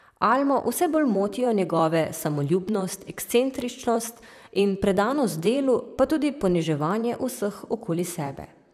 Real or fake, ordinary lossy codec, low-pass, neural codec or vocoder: fake; none; 14.4 kHz; vocoder, 44.1 kHz, 128 mel bands, Pupu-Vocoder